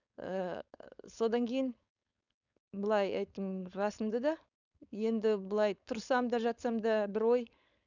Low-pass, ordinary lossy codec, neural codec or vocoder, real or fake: 7.2 kHz; none; codec, 16 kHz, 4.8 kbps, FACodec; fake